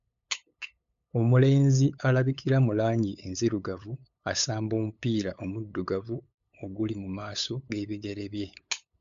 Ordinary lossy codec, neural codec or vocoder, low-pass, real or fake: MP3, 64 kbps; codec, 16 kHz, 8 kbps, FunCodec, trained on LibriTTS, 25 frames a second; 7.2 kHz; fake